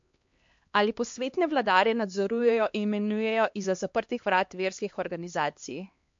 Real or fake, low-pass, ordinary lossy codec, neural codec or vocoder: fake; 7.2 kHz; MP3, 48 kbps; codec, 16 kHz, 2 kbps, X-Codec, HuBERT features, trained on LibriSpeech